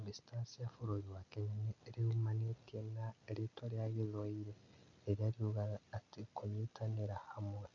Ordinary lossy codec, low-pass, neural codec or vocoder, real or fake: none; 7.2 kHz; none; real